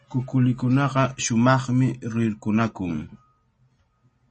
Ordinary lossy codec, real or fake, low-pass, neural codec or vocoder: MP3, 32 kbps; real; 10.8 kHz; none